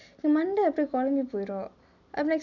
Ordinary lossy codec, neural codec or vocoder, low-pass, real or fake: none; none; 7.2 kHz; real